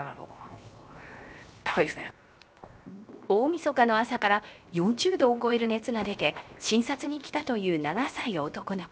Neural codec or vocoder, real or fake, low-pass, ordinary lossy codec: codec, 16 kHz, 0.7 kbps, FocalCodec; fake; none; none